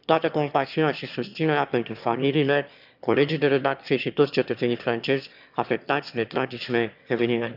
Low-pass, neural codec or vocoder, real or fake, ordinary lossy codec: 5.4 kHz; autoencoder, 22.05 kHz, a latent of 192 numbers a frame, VITS, trained on one speaker; fake; none